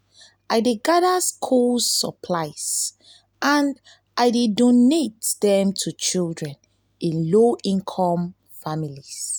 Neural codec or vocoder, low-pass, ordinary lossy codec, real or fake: none; none; none; real